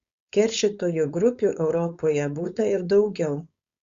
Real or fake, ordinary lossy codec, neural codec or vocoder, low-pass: fake; Opus, 64 kbps; codec, 16 kHz, 4.8 kbps, FACodec; 7.2 kHz